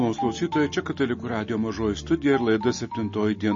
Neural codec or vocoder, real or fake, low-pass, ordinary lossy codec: none; real; 7.2 kHz; MP3, 32 kbps